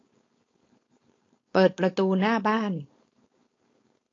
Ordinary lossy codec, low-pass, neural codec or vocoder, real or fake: AAC, 32 kbps; 7.2 kHz; codec, 16 kHz, 4.8 kbps, FACodec; fake